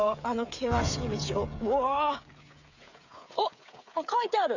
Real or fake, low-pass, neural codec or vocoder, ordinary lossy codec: fake; 7.2 kHz; codec, 16 kHz, 8 kbps, FreqCodec, smaller model; none